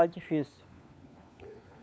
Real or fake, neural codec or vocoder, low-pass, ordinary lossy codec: fake; codec, 16 kHz, 4 kbps, FunCodec, trained on LibriTTS, 50 frames a second; none; none